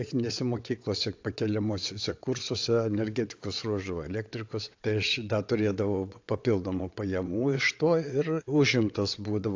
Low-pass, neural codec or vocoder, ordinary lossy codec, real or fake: 7.2 kHz; vocoder, 22.05 kHz, 80 mel bands, WaveNeXt; AAC, 48 kbps; fake